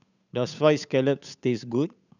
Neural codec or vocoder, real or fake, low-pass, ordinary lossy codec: codec, 16 kHz, 8 kbps, FunCodec, trained on Chinese and English, 25 frames a second; fake; 7.2 kHz; none